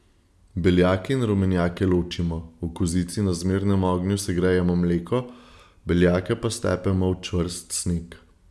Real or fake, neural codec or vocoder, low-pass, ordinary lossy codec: real; none; none; none